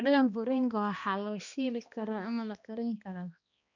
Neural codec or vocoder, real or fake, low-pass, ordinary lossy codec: codec, 16 kHz, 1 kbps, X-Codec, HuBERT features, trained on balanced general audio; fake; 7.2 kHz; none